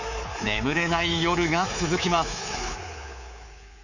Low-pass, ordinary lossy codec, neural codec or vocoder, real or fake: 7.2 kHz; none; codec, 24 kHz, 3.1 kbps, DualCodec; fake